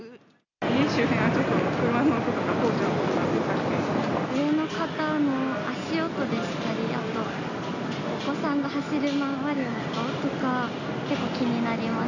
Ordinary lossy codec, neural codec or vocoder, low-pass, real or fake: none; none; 7.2 kHz; real